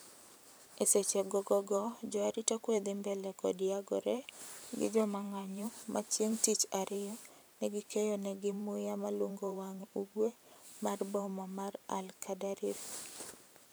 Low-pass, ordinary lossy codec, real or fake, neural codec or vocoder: none; none; fake; vocoder, 44.1 kHz, 128 mel bands every 512 samples, BigVGAN v2